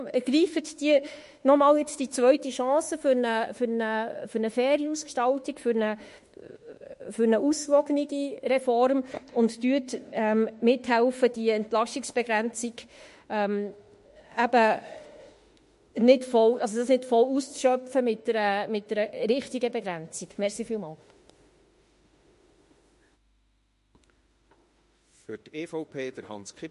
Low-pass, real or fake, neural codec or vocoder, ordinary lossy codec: 14.4 kHz; fake; autoencoder, 48 kHz, 32 numbers a frame, DAC-VAE, trained on Japanese speech; MP3, 48 kbps